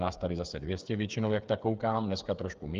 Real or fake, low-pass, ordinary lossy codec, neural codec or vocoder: fake; 7.2 kHz; Opus, 24 kbps; codec, 16 kHz, 8 kbps, FreqCodec, smaller model